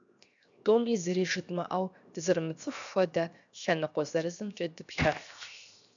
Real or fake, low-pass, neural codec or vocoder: fake; 7.2 kHz; codec, 16 kHz, 0.7 kbps, FocalCodec